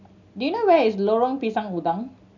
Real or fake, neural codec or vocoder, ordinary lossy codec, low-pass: real; none; none; 7.2 kHz